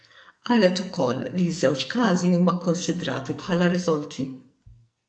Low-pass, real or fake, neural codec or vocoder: 9.9 kHz; fake; codec, 44.1 kHz, 2.6 kbps, SNAC